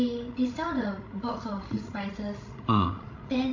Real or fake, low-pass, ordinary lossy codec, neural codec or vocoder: fake; 7.2 kHz; AAC, 48 kbps; codec, 16 kHz, 16 kbps, FreqCodec, larger model